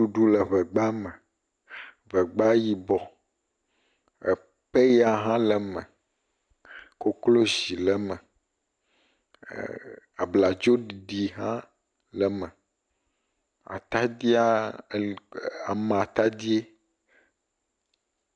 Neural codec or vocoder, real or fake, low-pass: none; real; 9.9 kHz